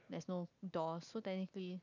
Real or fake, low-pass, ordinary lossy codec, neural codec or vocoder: real; 7.2 kHz; none; none